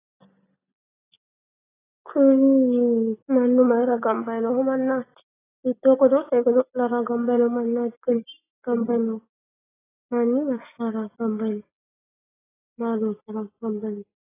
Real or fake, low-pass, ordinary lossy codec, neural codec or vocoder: real; 3.6 kHz; AAC, 16 kbps; none